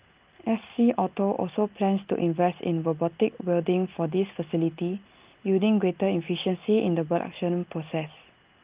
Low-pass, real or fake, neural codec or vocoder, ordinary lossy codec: 3.6 kHz; real; none; Opus, 32 kbps